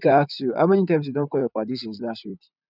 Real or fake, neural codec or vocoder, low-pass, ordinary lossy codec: fake; vocoder, 44.1 kHz, 128 mel bands, Pupu-Vocoder; 5.4 kHz; none